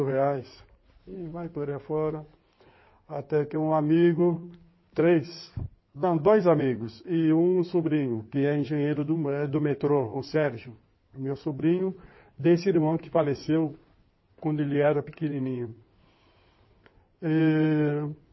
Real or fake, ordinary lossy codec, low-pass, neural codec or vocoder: fake; MP3, 24 kbps; 7.2 kHz; codec, 16 kHz in and 24 kHz out, 2.2 kbps, FireRedTTS-2 codec